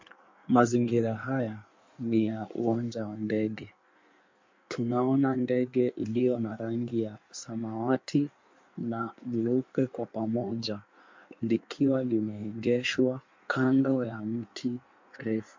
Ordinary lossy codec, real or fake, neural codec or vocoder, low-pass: MP3, 48 kbps; fake; codec, 16 kHz in and 24 kHz out, 1.1 kbps, FireRedTTS-2 codec; 7.2 kHz